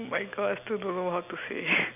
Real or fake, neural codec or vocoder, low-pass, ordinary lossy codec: real; none; 3.6 kHz; none